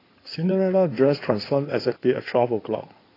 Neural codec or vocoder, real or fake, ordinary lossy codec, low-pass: codec, 16 kHz in and 24 kHz out, 2.2 kbps, FireRedTTS-2 codec; fake; AAC, 24 kbps; 5.4 kHz